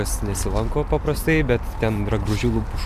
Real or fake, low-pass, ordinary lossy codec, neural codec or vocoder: real; 14.4 kHz; MP3, 96 kbps; none